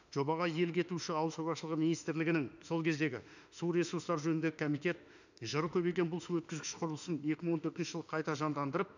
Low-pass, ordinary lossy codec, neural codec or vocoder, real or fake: 7.2 kHz; none; autoencoder, 48 kHz, 32 numbers a frame, DAC-VAE, trained on Japanese speech; fake